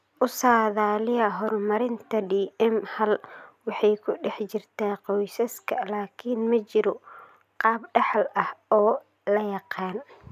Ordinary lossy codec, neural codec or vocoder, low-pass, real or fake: none; none; 14.4 kHz; real